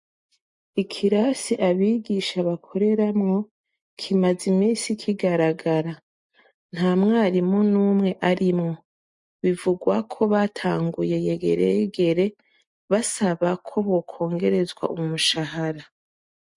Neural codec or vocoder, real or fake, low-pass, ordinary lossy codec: none; real; 10.8 kHz; MP3, 48 kbps